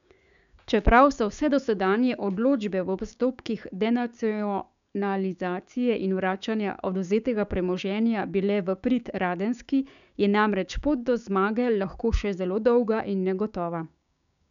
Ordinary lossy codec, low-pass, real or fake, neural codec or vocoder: none; 7.2 kHz; fake; codec, 16 kHz, 6 kbps, DAC